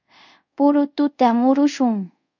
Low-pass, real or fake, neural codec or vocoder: 7.2 kHz; fake; codec, 24 kHz, 0.5 kbps, DualCodec